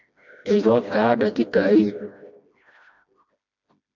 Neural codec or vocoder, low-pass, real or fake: codec, 16 kHz, 1 kbps, FreqCodec, smaller model; 7.2 kHz; fake